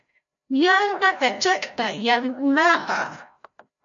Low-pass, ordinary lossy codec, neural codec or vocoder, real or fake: 7.2 kHz; MP3, 48 kbps; codec, 16 kHz, 0.5 kbps, FreqCodec, larger model; fake